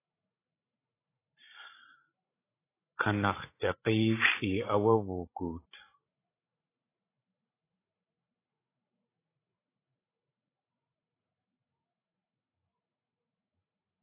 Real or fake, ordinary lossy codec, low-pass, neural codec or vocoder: fake; AAC, 16 kbps; 3.6 kHz; codec, 16 kHz, 16 kbps, FreqCodec, larger model